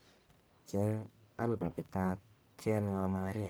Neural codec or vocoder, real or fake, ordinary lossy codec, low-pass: codec, 44.1 kHz, 1.7 kbps, Pupu-Codec; fake; none; none